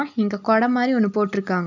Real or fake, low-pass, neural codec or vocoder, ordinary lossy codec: real; 7.2 kHz; none; none